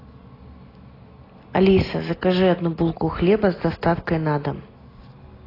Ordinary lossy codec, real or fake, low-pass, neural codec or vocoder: AAC, 24 kbps; real; 5.4 kHz; none